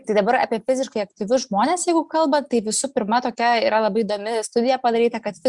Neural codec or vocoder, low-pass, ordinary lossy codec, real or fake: none; 10.8 kHz; Opus, 64 kbps; real